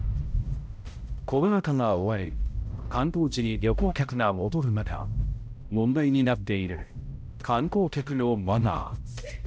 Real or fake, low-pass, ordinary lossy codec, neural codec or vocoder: fake; none; none; codec, 16 kHz, 0.5 kbps, X-Codec, HuBERT features, trained on balanced general audio